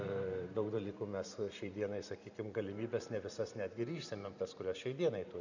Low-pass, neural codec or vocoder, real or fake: 7.2 kHz; vocoder, 44.1 kHz, 128 mel bands every 512 samples, BigVGAN v2; fake